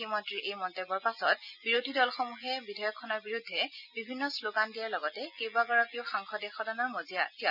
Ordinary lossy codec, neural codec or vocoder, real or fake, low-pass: none; none; real; 5.4 kHz